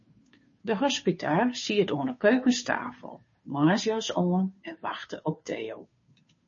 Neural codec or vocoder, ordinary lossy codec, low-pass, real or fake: codec, 16 kHz, 2 kbps, FunCodec, trained on Chinese and English, 25 frames a second; MP3, 32 kbps; 7.2 kHz; fake